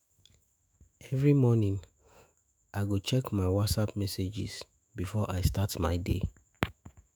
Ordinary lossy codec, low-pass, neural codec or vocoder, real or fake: none; none; autoencoder, 48 kHz, 128 numbers a frame, DAC-VAE, trained on Japanese speech; fake